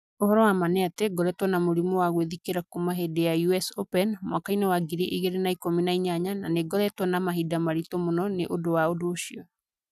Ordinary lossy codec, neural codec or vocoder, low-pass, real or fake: none; none; 14.4 kHz; real